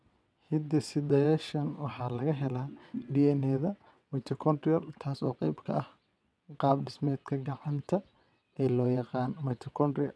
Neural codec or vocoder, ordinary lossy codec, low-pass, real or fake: vocoder, 22.05 kHz, 80 mel bands, WaveNeXt; none; none; fake